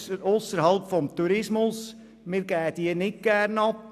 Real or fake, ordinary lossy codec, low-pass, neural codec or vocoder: real; none; 14.4 kHz; none